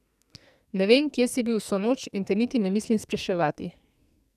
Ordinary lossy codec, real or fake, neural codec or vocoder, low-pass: none; fake; codec, 44.1 kHz, 2.6 kbps, SNAC; 14.4 kHz